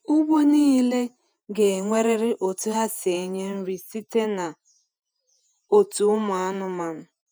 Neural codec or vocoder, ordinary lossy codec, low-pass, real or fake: vocoder, 48 kHz, 128 mel bands, Vocos; none; none; fake